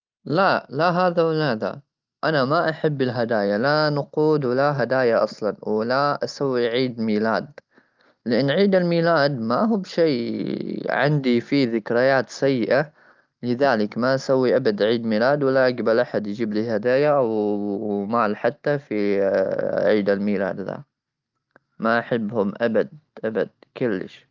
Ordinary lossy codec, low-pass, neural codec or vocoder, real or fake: Opus, 24 kbps; 7.2 kHz; none; real